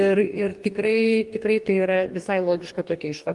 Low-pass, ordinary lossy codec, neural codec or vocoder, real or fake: 10.8 kHz; Opus, 24 kbps; codec, 44.1 kHz, 2.6 kbps, DAC; fake